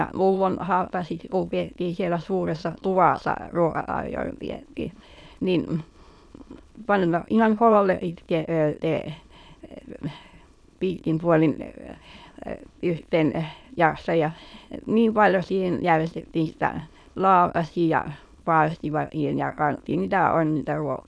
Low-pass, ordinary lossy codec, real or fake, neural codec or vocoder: none; none; fake; autoencoder, 22.05 kHz, a latent of 192 numbers a frame, VITS, trained on many speakers